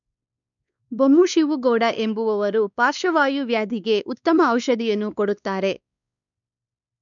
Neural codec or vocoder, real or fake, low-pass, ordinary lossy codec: codec, 16 kHz, 2 kbps, X-Codec, WavLM features, trained on Multilingual LibriSpeech; fake; 7.2 kHz; none